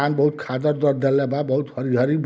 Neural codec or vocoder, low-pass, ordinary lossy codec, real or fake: none; none; none; real